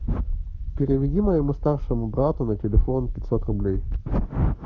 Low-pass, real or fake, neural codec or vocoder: 7.2 kHz; fake; codec, 44.1 kHz, 7.8 kbps, Pupu-Codec